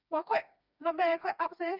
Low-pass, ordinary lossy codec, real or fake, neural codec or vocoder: 5.4 kHz; none; fake; codec, 16 kHz, 2 kbps, FreqCodec, smaller model